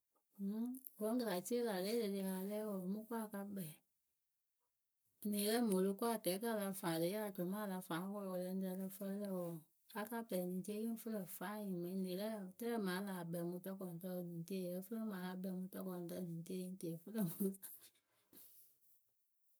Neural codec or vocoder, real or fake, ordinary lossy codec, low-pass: codec, 44.1 kHz, 7.8 kbps, Pupu-Codec; fake; none; none